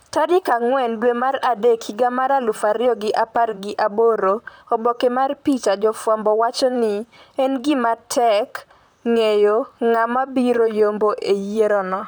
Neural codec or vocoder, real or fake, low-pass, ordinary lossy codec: vocoder, 44.1 kHz, 128 mel bands, Pupu-Vocoder; fake; none; none